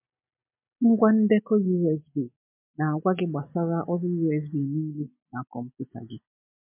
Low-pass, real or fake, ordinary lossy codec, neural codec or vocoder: 3.6 kHz; real; MP3, 32 kbps; none